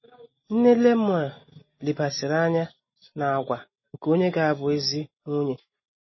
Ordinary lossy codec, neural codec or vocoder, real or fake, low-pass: MP3, 24 kbps; none; real; 7.2 kHz